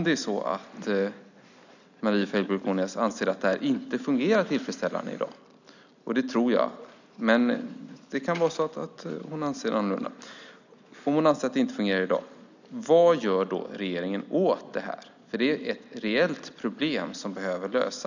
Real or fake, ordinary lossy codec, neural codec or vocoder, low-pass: real; none; none; 7.2 kHz